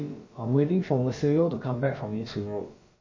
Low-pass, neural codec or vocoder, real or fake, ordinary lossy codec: 7.2 kHz; codec, 16 kHz, about 1 kbps, DyCAST, with the encoder's durations; fake; MP3, 32 kbps